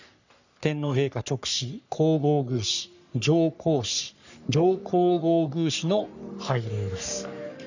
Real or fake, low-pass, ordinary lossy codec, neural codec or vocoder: fake; 7.2 kHz; none; codec, 44.1 kHz, 3.4 kbps, Pupu-Codec